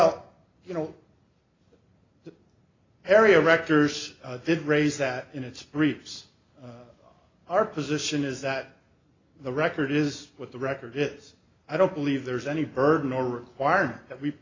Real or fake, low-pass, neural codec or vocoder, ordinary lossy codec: real; 7.2 kHz; none; AAC, 32 kbps